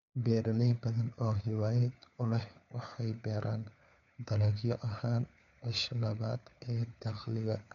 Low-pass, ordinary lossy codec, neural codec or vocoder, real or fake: 7.2 kHz; none; codec, 16 kHz, 4 kbps, FunCodec, trained on LibriTTS, 50 frames a second; fake